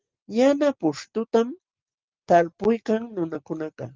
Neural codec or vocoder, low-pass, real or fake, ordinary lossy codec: none; 7.2 kHz; real; Opus, 24 kbps